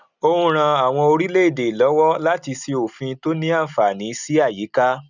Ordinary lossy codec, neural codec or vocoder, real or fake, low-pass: none; none; real; 7.2 kHz